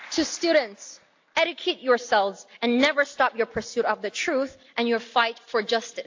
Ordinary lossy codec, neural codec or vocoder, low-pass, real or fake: AAC, 48 kbps; none; 7.2 kHz; real